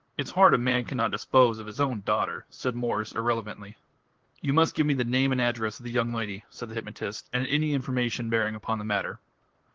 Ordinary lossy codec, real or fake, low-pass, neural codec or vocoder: Opus, 16 kbps; fake; 7.2 kHz; vocoder, 44.1 kHz, 128 mel bands, Pupu-Vocoder